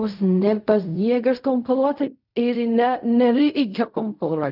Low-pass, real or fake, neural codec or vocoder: 5.4 kHz; fake; codec, 16 kHz in and 24 kHz out, 0.4 kbps, LongCat-Audio-Codec, fine tuned four codebook decoder